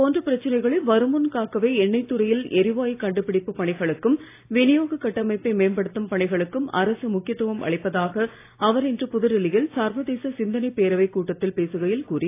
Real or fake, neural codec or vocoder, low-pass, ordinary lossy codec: real; none; 3.6 kHz; AAC, 24 kbps